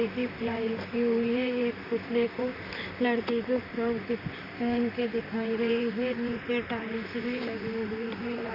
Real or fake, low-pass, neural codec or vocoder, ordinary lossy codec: fake; 5.4 kHz; vocoder, 44.1 kHz, 128 mel bands, Pupu-Vocoder; none